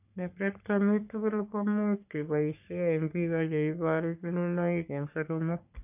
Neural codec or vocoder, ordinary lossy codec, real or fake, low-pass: codec, 44.1 kHz, 3.4 kbps, Pupu-Codec; none; fake; 3.6 kHz